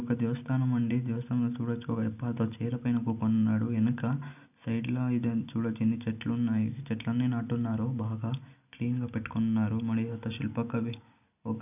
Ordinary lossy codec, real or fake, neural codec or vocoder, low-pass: none; real; none; 3.6 kHz